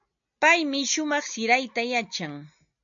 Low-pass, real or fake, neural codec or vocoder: 7.2 kHz; real; none